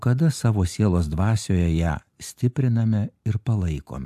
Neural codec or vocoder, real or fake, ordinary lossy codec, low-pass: none; real; AAC, 96 kbps; 14.4 kHz